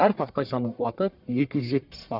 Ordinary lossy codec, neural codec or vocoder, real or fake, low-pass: none; codec, 44.1 kHz, 1.7 kbps, Pupu-Codec; fake; 5.4 kHz